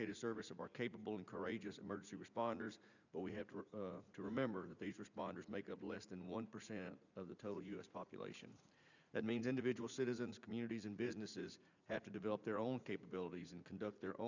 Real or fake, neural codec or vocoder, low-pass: fake; vocoder, 44.1 kHz, 80 mel bands, Vocos; 7.2 kHz